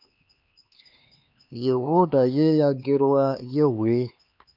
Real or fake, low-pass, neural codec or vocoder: fake; 5.4 kHz; codec, 16 kHz, 2 kbps, X-Codec, HuBERT features, trained on LibriSpeech